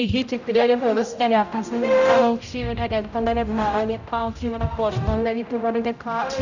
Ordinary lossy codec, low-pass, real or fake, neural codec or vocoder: none; 7.2 kHz; fake; codec, 16 kHz, 0.5 kbps, X-Codec, HuBERT features, trained on general audio